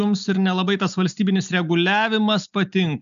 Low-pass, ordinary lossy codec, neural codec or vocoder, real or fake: 7.2 kHz; MP3, 96 kbps; none; real